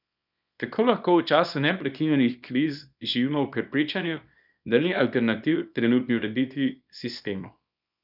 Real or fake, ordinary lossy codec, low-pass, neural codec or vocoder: fake; none; 5.4 kHz; codec, 24 kHz, 0.9 kbps, WavTokenizer, small release